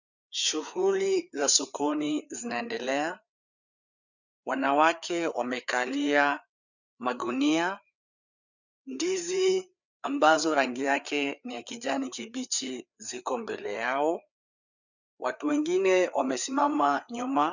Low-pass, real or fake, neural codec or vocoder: 7.2 kHz; fake; codec, 16 kHz, 4 kbps, FreqCodec, larger model